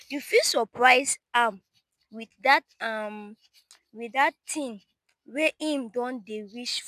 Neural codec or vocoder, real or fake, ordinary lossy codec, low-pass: none; real; none; 14.4 kHz